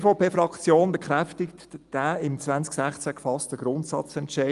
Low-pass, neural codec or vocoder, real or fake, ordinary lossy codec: 10.8 kHz; none; real; Opus, 32 kbps